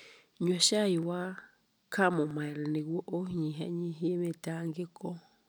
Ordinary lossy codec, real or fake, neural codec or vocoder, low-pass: none; real; none; none